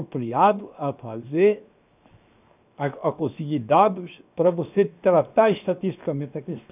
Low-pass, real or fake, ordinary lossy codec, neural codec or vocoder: 3.6 kHz; fake; none; codec, 16 kHz, 0.7 kbps, FocalCodec